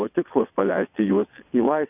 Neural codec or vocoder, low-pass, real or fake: vocoder, 22.05 kHz, 80 mel bands, WaveNeXt; 3.6 kHz; fake